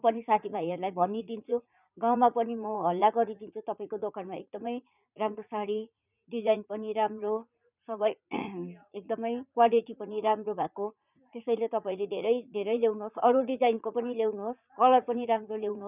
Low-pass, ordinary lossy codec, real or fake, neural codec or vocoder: 3.6 kHz; none; fake; vocoder, 44.1 kHz, 80 mel bands, Vocos